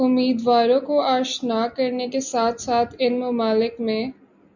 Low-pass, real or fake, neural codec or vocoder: 7.2 kHz; real; none